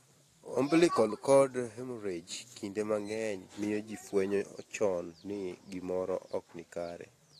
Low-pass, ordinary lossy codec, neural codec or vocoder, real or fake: 14.4 kHz; AAC, 48 kbps; vocoder, 44.1 kHz, 128 mel bands every 512 samples, BigVGAN v2; fake